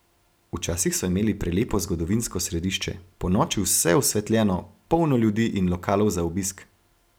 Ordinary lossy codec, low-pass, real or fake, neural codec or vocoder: none; none; real; none